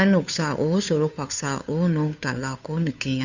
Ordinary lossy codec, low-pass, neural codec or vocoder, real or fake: none; 7.2 kHz; codec, 16 kHz, 2 kbps, FunCodec, trained on Chinese and English, 25 frames a second; fake